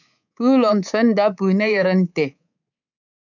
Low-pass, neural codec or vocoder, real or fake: 7.2 kHz; codec, 24 kHz, 3.1 kbps, DualCodec; fake